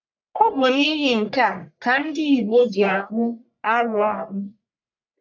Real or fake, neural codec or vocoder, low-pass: fake; codec, 44.1 kHz, 1.7 kbps, Pupu-Codec; 7.2 kHz